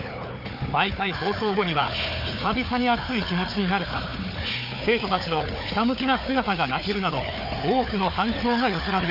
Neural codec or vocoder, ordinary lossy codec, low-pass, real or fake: codec, 16 kHz, 4 kbps, FunCodec, trained on Chinese and English, 50 frames a second; none; 5.4 kHz; fake